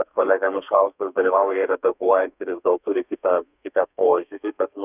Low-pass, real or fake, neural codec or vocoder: 3.6 kHz; fake; codec, 32 kHz, 1.9 kbps, SNAC